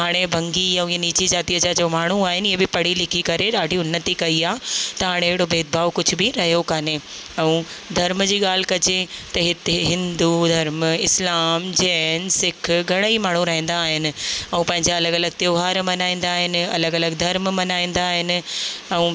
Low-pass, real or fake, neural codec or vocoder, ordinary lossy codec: none; real; none; none